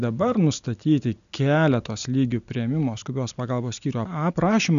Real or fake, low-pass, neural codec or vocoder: real; 7.2 kHz; none